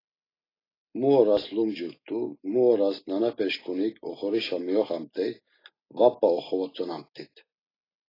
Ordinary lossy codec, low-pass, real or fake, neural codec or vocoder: AAC, 24 kbps; 5.4 kHz; real; none